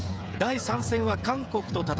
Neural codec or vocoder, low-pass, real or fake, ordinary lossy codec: codec, 16 kHz, 16 kbps, FreqCodec, smaller model; none; fake; none